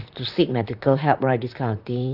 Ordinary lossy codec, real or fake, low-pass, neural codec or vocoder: none; fake; 5.4 kHz; vocoder, 44.1 kHz, 128 mel bands, Pupu-Vocoder